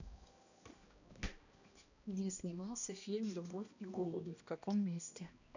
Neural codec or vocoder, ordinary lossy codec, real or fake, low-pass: codec, 16 kHz, 1 kbps, X-Codec, HuBERT features, trained on balanced general audio; none; fake; 7.2 kHz